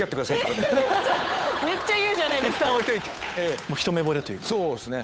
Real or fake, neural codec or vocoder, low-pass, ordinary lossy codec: fake; codec, 16 kHz, 8 kbps, FunCodec, trained on Chinese and English, 25 frames a second; none; none